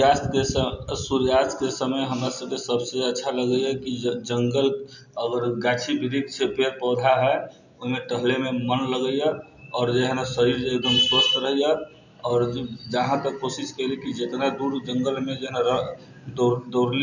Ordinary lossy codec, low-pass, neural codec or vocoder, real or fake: none; 7.2 kHz; none; real